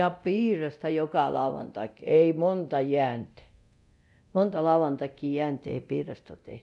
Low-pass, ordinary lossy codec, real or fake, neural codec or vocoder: 10.8 kHz; none; fake; codec, 24 kHz, 0.9 kbps, DualCodec